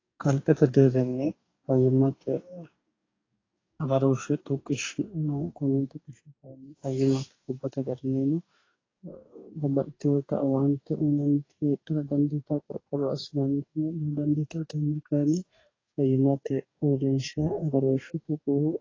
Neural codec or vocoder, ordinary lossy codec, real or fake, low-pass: codec, 44.1 kHz, 2.6 kbps, DAC; AAC, 32 kbps; fake; 7.2 kHz